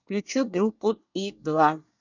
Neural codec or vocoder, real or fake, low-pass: codec, 24 kHz, 1 kbps, SNAC; fake; 7.2 kHz